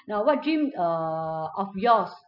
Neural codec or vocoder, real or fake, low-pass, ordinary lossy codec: none; real; 5.4 kHz; none